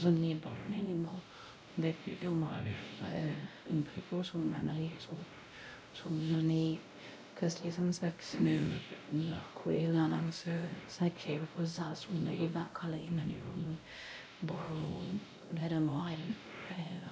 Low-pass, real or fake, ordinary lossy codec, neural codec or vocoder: none; fake; none; codec, 16 kHz, 0.5 kbps, X-Codec, WavLM features, trained on Multilingual LibriSpeech